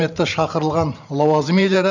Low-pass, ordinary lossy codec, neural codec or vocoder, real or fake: 7.2 kHz; none; vocoder, 44.1 kHz, 128 mel bands every 512 samples, BigVGAN v2; fake